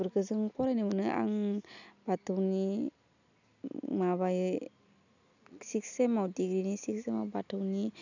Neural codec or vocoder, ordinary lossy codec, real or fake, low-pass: none; none; real; 7.2 kHz